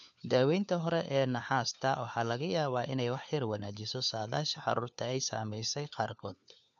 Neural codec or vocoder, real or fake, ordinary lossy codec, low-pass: codec, 16 kHz, 4 kbps, FunCodec, trained on LibriTTS, 50 frames a second; fake; none; 7.2 kHz